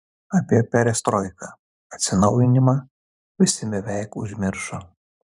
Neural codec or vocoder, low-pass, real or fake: vocoder, 44.1 kHz, 128 mel bands every 512 samples, BigVGAN v2; 10.8 kHz; fake